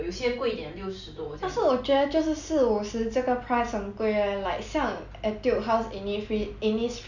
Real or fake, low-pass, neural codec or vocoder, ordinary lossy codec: real; 7.2 kHz; none; none